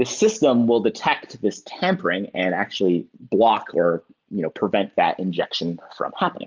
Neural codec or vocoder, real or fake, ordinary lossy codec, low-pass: none; real; Opus, 32 kbps; 7.2 kHz